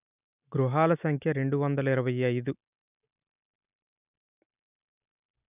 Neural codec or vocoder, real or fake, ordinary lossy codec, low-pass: none; real; none; 3.6 kHz